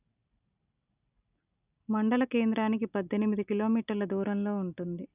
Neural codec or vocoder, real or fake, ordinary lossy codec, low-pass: none; real; none; 3.6 kHz